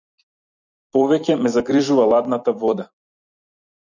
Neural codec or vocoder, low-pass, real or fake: vocoder, 44.1 kHz, 128 mel bands every 256 samples, BigVGAN v2; 7.2 kHz; fake